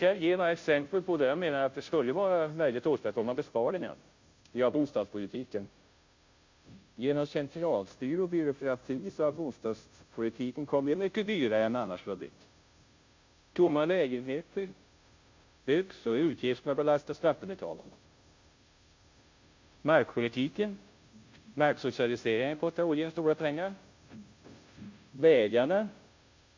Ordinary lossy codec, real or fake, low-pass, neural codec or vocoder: MP3, 48 kbps; fake; 7.2 kHz; codec, 16 kHz, 0.5 kbps, FunCodec, trained on Chinese and English, 25 frames a second